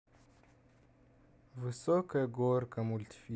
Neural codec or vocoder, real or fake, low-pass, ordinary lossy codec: none; real; none; none